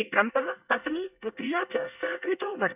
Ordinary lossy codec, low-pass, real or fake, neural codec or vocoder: none; 3.6 kHz; fake; codec, 24 kHz, 1 kbps, SNAC